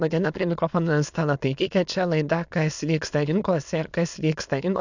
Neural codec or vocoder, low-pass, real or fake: autoencoder, 22.05 kHz, a latent of 192 numbers a frame, VITS, trained on many speakers; 7.2 kHz; fake